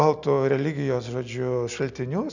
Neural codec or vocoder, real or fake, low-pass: none; real; 7.2 kHz